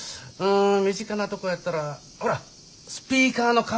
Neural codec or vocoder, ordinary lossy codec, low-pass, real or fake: none; none; none; real